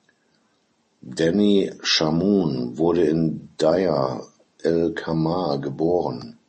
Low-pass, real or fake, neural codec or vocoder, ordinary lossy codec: 10.8 kHz; real; none; MP3, 32 kbps